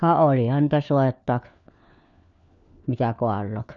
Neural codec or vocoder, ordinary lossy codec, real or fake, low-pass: codec, 16 kHz, 4 kbps, FunCodec, trained on LibriTTS, 50 frames a second; none; fake; 7.2 kHz